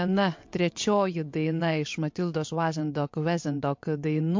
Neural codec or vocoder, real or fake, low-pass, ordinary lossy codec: vocoder, 22.05 kHz, 80 mel bands, WaveNeXt; fake; 7.2 kHz; MP3, 48 kbps